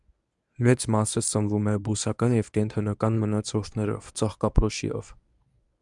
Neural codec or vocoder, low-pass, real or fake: codec, 24 kHz, 0.9 kbps, WavTokenizer, medium speech release version 1; 10.8 kHz; fake